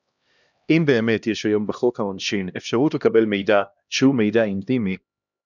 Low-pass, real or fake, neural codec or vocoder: 7.2 kHz; fake; codec, 16 kHz, 1 kbps, X-Codec, HuBERT features, trained on LibriSpeech